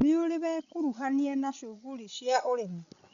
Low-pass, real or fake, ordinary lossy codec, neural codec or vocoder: 7.2 kHz; fake; none; codec, 16 kHz, 16 kbps, FreqCodec, larger model